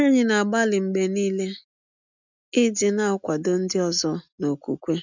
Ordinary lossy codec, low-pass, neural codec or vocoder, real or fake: none; 7.2 kHz; none; real